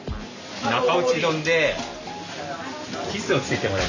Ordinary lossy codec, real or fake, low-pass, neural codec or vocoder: none; real; 7.2 kHz; none